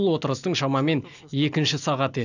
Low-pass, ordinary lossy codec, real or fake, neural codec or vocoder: 7.2 kHz; none; real; none